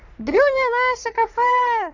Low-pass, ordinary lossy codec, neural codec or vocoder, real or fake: 7.2 kHz; none; codec, 44.1 kHz, 3.4 kbps, Pupu-Codec; fake